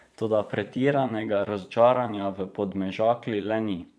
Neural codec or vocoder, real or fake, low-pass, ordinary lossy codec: vocoder, 22.05 kHz, 80 mel bands, Vocos; fake; none; none